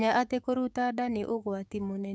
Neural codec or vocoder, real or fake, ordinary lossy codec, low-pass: codec, 16 kHz, 6 kbps, DAC; fake; none; none